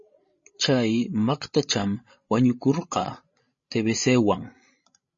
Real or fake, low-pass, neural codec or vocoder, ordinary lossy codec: fake; 7.2 kHz; codec, 16 kHz, 16 kbps, FreqCodec, larger model; MP3, 32 kbps